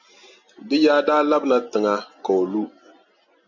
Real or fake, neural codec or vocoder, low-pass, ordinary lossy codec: real; none; 7.2 kHz; MP3, 64 kbps